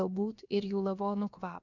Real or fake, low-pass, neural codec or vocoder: fake; 7.2 kHz; codec, 16 kHz, about 1 kbps, DyCAST, with the encoder's durations